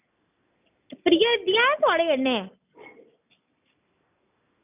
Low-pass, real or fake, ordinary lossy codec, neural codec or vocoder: 3.6 kHz; real; none; none